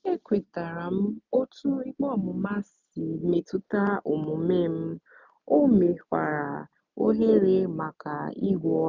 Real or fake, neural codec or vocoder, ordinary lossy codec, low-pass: real; none; none; 7.2 kHz